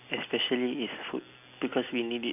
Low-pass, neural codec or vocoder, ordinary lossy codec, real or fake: 3.6 kHz; none; none; real